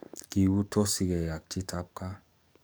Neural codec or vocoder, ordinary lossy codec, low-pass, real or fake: codec, 44.1 kHz, 7.8 kbps, DAC; none; none; fake